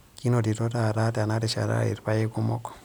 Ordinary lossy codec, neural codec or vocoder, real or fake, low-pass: none; none; real; none